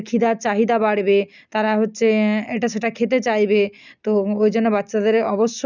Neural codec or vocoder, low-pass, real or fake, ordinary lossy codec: none; 7.2 kHz; real; none